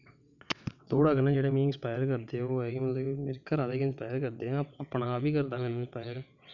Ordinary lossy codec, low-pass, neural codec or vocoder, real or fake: none; 7.2 kHz; vocoder, 22.05 kHz, 80 mel bands, WaveNeXt; fake